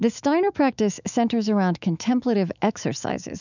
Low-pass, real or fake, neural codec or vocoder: 7.2 kHz; fake; autoencoder, 48 kHz, 128 numbers a frame, DAC-VAE, trained on Japanese speech